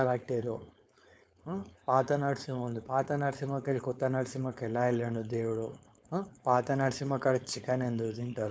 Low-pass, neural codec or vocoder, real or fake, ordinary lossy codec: none; codec, 16 kHz, 4.8 kbps, FACodec; fake; none